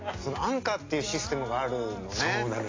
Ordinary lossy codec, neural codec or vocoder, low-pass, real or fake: AAC, 32 kbps; none; 7.2 kHz; real